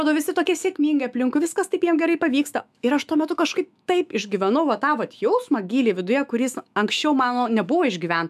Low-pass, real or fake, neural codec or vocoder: 14.4 kHz; fake; autoencoder, 48 kHz, 128 numbers a frame, DAC-VAE, trained on Japanese speech